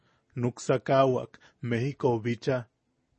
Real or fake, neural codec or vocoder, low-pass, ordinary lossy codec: real; none; 10.8 kHz; MP3, 32 kbps